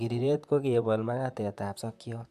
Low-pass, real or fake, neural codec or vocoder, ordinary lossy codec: 14.4 kHz; fake; vocoder, 44.1 kHz, 128 mel bands, Pupu-Vocoder; AAC, 96 kbps